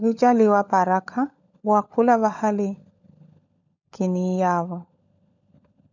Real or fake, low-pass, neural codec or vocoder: fake; 7.2 kHz; codec, 16 kHz, 16 kbps, FunCodec, trained on LibriTTS, 50 frames a second